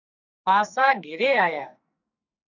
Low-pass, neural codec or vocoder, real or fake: 7.2 kHz; codec, 44.1 kHz, 2.6 kbps, SNAC; fake